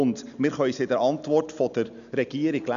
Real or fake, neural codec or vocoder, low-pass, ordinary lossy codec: real; none; 7.2 kHz; none